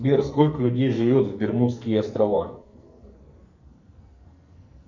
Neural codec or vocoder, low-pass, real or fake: codec, 44.1 kHz, 2.6 kbps, SNAC; 7.2 kHz; fake